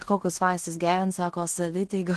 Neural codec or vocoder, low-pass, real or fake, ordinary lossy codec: codec, 16 kHz in and 24 kHz out, 0.9 kbps, LongCat-Audio-Codec, fine tuned four codebook decoder; 10.8 kHz; fake; Opus, 16 kbps